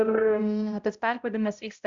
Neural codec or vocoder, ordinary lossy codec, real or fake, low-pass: codec, 16 kHz, 0.5 kbps, X-Codec, HuBERT features, trained on balanced general audio; Opus, 64 kbps; fake; 7.2 kHz